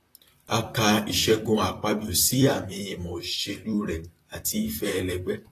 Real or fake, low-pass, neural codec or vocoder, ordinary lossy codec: fake; 14.4 kHz; vocoder, 44.1 kHz, 128 mel bands, Pupu-Vocoder; AAC, 48 kbps